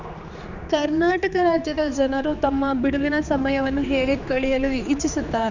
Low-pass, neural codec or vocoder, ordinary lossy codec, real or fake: 7.2 kHz; codec, 16 kHz, 4 kbps, X-Codec, HuBERT features, trained on general audio; none; fake